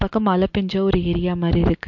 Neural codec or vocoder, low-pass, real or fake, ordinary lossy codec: none; 7.2 kHz; real; MP3, 48 kbps